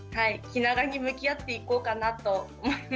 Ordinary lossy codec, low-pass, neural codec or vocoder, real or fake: none; none; none; real